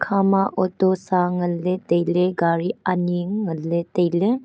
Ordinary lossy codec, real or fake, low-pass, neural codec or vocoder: none; real; none; none